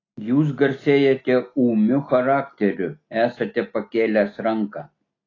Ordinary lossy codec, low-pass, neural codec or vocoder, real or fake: AAC, 32 kbps; 7.2 kHz; none; real